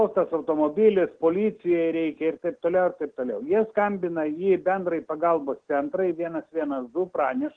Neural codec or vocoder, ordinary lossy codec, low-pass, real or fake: none; Opus, 16 kbps; 9.9 kHz; real